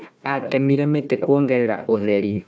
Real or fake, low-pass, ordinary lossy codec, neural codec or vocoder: fake; none; none; codec, 16 kHz, 1 kbps, FunCodec, trained on Chinese and English, 50 frames a second